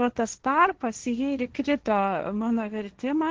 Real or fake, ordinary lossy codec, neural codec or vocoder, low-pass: fake; Opus, 16 kbps; codec, 16 kHz, 1.1 kbps, Voila-Tokenizer; 7.2 kHz